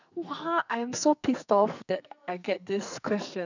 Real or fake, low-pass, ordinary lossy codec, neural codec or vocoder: fake; 7.2 kHz; none; codec, 44.1 kHz, 2.6 kbps, SNAC